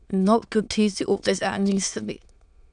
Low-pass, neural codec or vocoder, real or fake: 9.9 kHz; autoencoder, 22.05 kHz, a latent of 192 numbers a frame, VITS, trained on many speakers; fake